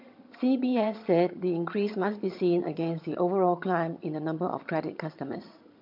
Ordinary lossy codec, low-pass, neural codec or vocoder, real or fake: MP3, 48 kbps; 5.4 kHz; vocoder, 22.05 kHz, 80 mel bands, HiFi-GAN; fake